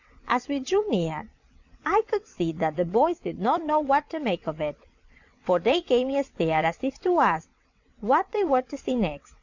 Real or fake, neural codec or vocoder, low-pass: fake; vocoder, 22.05 kHz, 80 mel bands, WaveNeXt; 7.2 kHz